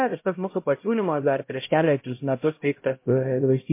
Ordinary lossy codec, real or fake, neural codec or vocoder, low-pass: MP3, 24 kbps; fake; codec, 16 kHz, 0.5 kbps, X-Codec, HuBERT features, trained on LibriSpeech; 3.6 kHz